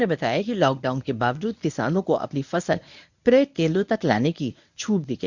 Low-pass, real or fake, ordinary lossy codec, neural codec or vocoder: 7.2 kHz; fake; none; codec, 24 kHz, 0.9 kbps, WavTokenizer, medium speech release version 1